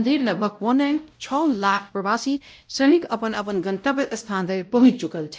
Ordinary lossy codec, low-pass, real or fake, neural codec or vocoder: none; none; fake; codec, 16 kHz, 0.5 kbps, X-Codec, WavLM features, trained on Multilingual LibriSpeech